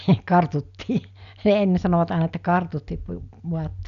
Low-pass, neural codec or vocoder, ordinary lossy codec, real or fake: 7.2 kHz; none; none; real